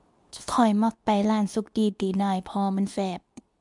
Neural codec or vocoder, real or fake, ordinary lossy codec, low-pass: codec, 24 kHz, 0.9 kbps, WavTokenizer, medium speech release version 2; fake; MP3, 96 kbps; 10.8 kHz